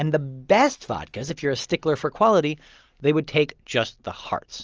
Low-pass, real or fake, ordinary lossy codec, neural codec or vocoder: 7.2 kHz; real; Opus, 24 kbps; none